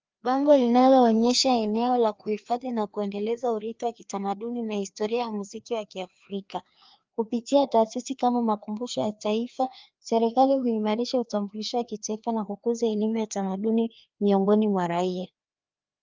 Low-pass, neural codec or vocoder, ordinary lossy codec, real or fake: 7.2 kHz; codec, 16 kHz, 2 kbps, FreqCodec, larger model; Opus, 24 kbps; fake